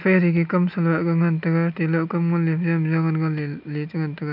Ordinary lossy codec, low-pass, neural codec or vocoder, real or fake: none; 5.4 kHz; none; real